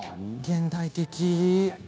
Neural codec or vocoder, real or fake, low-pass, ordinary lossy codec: codec, 16 kHz, 0.9 kbps, LongCat-Audio-Codec; fake; none; none